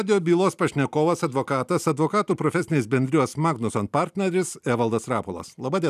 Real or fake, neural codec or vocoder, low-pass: fake; vocoder, 44.1 kHz, 128 mel bands every 256 samples, BigVGAN v2; 14.4 kHz